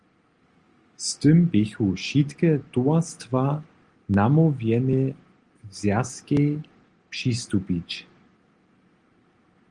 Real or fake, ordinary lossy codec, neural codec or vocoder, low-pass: real; Opus, 24 kbps; none; 9.9 kHz